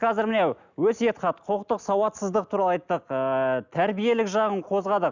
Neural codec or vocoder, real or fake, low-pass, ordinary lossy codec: none; real; 7.2 kHz; none